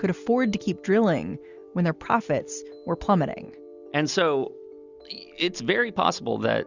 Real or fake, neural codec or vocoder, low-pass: real; none; 7.2 kHz